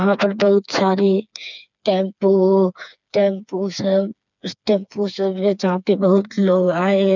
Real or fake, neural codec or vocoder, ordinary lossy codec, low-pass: fake; codec, 16 kHz, 4 kbps, FreqCodec, smaller model; none; 7.2 kHz